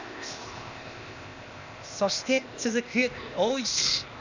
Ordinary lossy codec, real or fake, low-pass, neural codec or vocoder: none; fake; 7.2 kHz; codec, 16 kHz, 0.8 kbps, ZipCodec